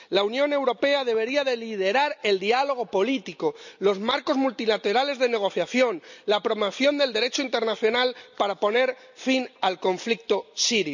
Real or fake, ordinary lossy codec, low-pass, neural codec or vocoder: real; none; 7.2 kHz; none